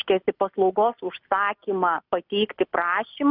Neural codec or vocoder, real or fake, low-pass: none; real; 5.4 kHz